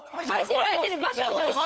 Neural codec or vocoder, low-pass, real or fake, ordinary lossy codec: codec, 16 kHz, 4 kbps, FunCodec, trained on LibriTTS, 50 frames a second; none; fake; none